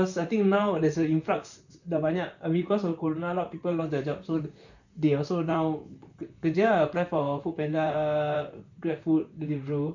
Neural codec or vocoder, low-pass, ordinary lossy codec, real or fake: vocoder, 44.1 kHz, 128 mel bands, Pupu-Vocoder; 7.2 kHz; none; fake